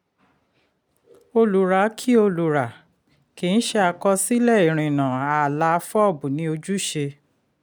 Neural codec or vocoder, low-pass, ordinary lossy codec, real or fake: none; none; none; real